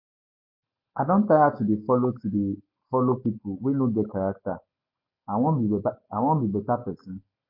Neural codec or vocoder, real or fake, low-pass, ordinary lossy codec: none; real; 5.4 kHz; MP3, 32 kbps